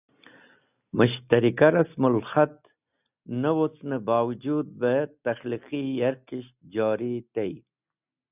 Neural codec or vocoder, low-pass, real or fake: none; 3.6 kHz; real